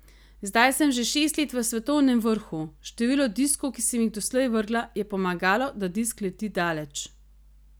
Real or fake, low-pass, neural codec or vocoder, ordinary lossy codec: real; none; none; none